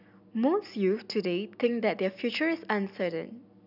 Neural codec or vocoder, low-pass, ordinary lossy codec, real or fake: none; 5.4 kHz; none; real